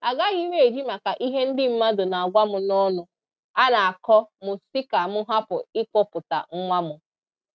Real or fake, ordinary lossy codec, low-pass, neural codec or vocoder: real; none; none; none